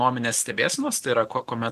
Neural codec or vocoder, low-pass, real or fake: none; 14.4 kHz; real